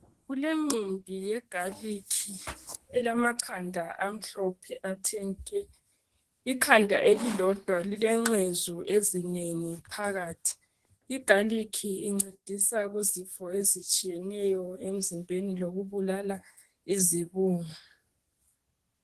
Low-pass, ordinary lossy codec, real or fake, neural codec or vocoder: 14.4 kHz; Opus, 16 kbps; fake; codec, 32 kHz, 1.9 kbps, SNAC